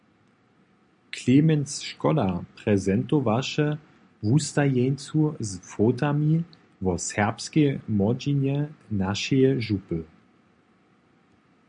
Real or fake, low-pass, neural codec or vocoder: real; 9.9 kHz; none